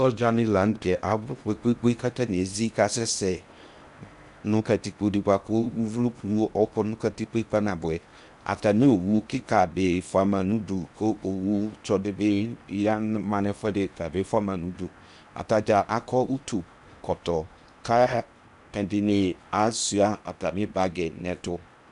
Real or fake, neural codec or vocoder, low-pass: fake; codec, 16 kHz in and 24 kHz out, 0.8 kbps, FocalCodec, streaming, 65536 codes; 10.8 kHz